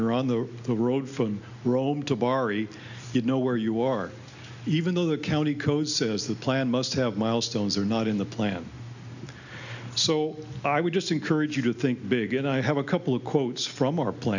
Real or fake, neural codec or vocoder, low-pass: real; none; 7.2 kHz